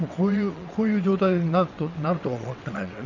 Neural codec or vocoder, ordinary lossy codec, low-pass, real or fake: vocoder, 22.05 kHz, 80 mel bands, WaveNeXt; none; 7.2 kHz; fake